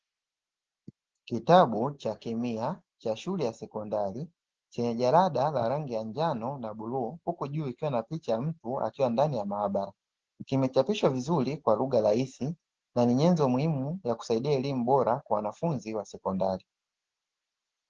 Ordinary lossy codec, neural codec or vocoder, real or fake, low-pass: Opus, 16 kbps; none; real; 7.2 kHz